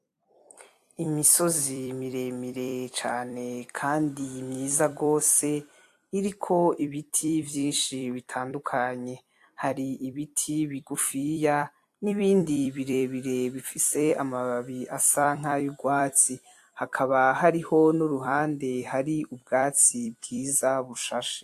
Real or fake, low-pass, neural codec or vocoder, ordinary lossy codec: fake; 14.4 kHz; vocoder, 44.1 kHz, 128 mel bands every 256 samples, BigVGAN v2; AAC, 64 kbps